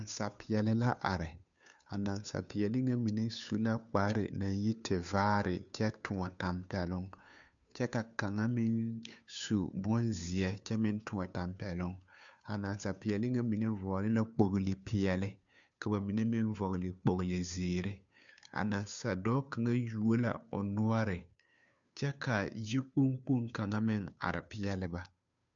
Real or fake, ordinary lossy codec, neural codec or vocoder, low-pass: fake; MP3, 96 kbps; codec, 16 kHz, 2 kbps, FunCodec, trained on Chinese and English, 25 frames a second; 7.2 kHz